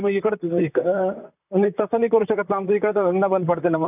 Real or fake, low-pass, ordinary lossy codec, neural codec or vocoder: fake; 3.6 kHz; none; vocoder, 44.1 kHz, 128 mel bands, Pupu-Vocoder